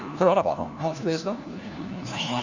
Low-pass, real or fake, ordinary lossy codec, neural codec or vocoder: 7.2 kHz; fake; none; codec, 16 kHz, 1 kbps, FunCodec, trained on LibriTTS, 50 frames a second